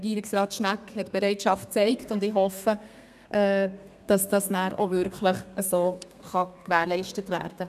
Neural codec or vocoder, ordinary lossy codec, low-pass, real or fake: codec, 32 kHz, 1.9 kbps, SNAC; none; 14.4 kHz; fake